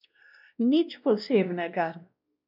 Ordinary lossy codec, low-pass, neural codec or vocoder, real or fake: none; 5.4 kHz; codec, 16 kHz, 1 kbps, X-Codec, WavLM features, trained on Multilingual LibriSpeech; fake